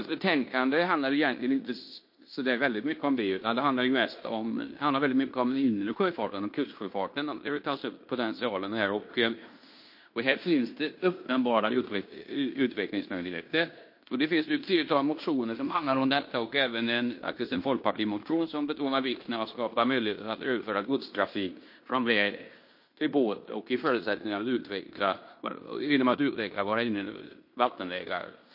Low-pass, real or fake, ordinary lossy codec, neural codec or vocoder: 5.4 kHz; fake; MP3, 32 kbps; codec, 16 kHz in and 24 kHz out, 0.9 kbps, LongCat-Audio-Codec, fine tuned four codebook decoder